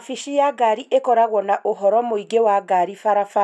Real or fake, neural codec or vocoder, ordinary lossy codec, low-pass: real; none; none; none